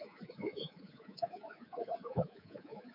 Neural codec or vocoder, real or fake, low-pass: codec, 24 kHz, 3.1 kbps, DualCodec; fake; 5.4 kHz